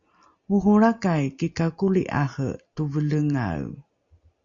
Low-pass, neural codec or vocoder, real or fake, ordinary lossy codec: 7.2 kHz; none; real; Opus, 64 kbps